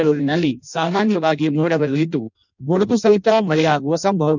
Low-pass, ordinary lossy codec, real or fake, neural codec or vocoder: 7.2 kHz; none; fake; codec, 16 kHz in and 24 kHz out, 0.6 kbps, FireRedTTS-2 codec